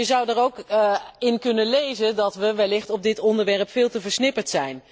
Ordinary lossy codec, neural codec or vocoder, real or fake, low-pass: none; none; real; none